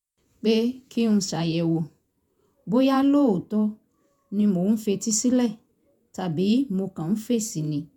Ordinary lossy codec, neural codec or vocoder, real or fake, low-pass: none; vocoder, 48 kHz, 128 mel bands, Vocos; fake; 19.8 kHz